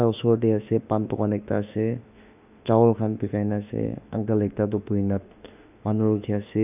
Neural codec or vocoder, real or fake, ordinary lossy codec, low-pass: autoencoder, 48 kHz, 32 numbers a frame, DAC-VAE, trained on Japanese speech; fake; none; 3.6 kHz